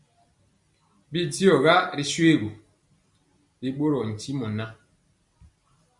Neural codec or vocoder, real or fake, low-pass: none; real; 10.8 kHz